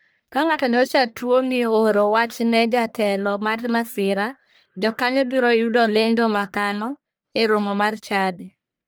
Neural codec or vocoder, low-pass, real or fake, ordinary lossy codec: codec, 44.1 kHz, 1.7 kbps, Pupu-Codec; none; fake; none